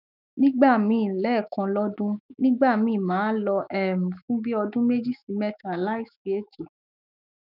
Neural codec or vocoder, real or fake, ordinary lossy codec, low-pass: autoencoder, 48 kHz, 128 numbers a frame, DAC-VAE, trained on Japanese speech; fake; none; 5.4 kHz